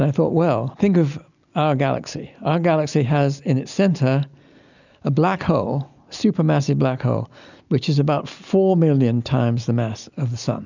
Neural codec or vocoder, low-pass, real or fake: none; 7.2 kHz; real